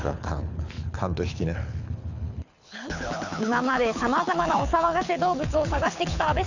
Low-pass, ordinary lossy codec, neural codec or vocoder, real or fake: 7.2 kHz; none; codec, 24 kHz, 6 kbps, HILCodec; fake